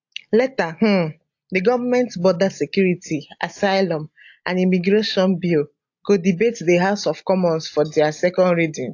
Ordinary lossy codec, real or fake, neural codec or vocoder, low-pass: AAC, 48 kbps; real; none; 7.2 kHz